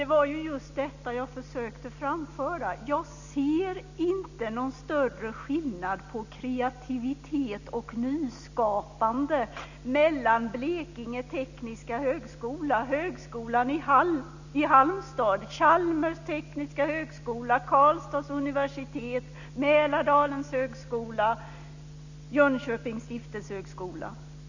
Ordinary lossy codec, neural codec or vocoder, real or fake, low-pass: none; none; real; 7.2 kHz